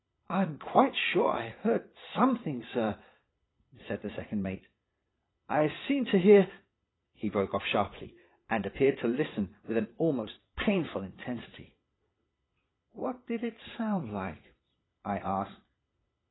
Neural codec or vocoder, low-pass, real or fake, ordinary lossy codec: vocoder, 22.05 kHz, 80 mel bands, Vocos; 7.2 kHz; fake; AAC, 16 kbps